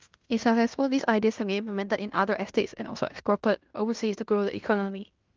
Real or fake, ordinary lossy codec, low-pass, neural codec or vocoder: fake; Opus, 32 kbps; 7.2 kHz; codec, 16 kHz in and 24 kHz out, 0.9 kbps, LongCat-Audio-Codec, fine tuned four codebook decoder